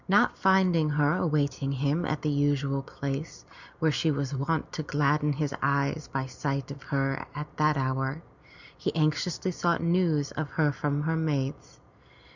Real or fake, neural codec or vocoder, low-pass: real; none; 7.2 kHz